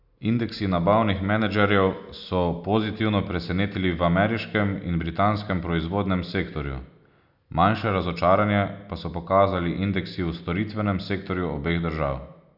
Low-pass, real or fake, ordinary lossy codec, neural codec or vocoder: 5.4 kHz; real; none; none